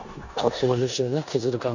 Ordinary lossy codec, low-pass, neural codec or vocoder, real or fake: none; 7.2 kHz; codec, 16 kHz in and 24 kHz out, 0.9 kbps, LongCat-Audio-Codec, four codebook decoder; fake